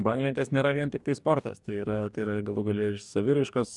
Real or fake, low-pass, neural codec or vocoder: fake; 10.8 kHz; codec, 44.1 kHz, 2.6 kbps, DAC